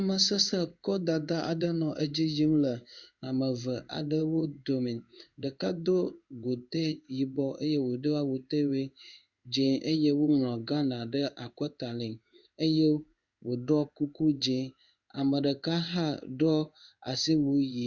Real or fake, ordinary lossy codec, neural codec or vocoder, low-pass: fake; Opus, 64 kbps; codec, 16 kHz in and 24 kHz out, 1 kbps, XY-Tokenizer; 7.2 kHz